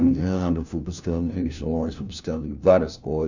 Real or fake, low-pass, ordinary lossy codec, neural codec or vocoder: fake; 7.2 kHz; none; codec, 16 kHz, 1 kbps, FunCodec, trained on LibriTTS, 50 frames a second